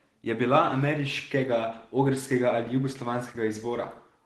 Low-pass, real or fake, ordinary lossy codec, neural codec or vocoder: 10.8 kHz; real; Opus, 16 kbps; none